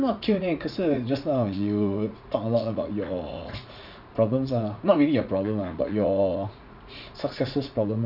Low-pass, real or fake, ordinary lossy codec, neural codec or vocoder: 5.4 kHz; fake; none; vocoder, 44.1 kHz, 80 mel bands, Vocos